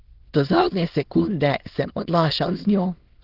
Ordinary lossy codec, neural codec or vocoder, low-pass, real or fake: Opus, 16 kbps; autoencoder, 22.05 kHz, a latent of 192 numbers a frame, VITS, trained on many speakers; 5.4 kHz; fake